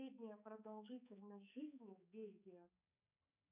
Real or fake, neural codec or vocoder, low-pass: fake; codec, 16 kHz, 4 kbps, X-Codec, HuBERT features, trained on balanced general audio; 3.6 kHz